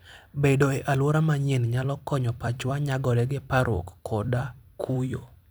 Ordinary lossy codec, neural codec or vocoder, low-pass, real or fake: none; vocoder, 44.1 kHz, 128 mel bands every 256 samples, BigVGAN v2; none; fake